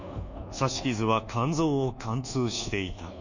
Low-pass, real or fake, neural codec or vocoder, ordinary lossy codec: 7.2 kHz; fake; codec, 24 kHz, 1.2 kbps, DualCodec; none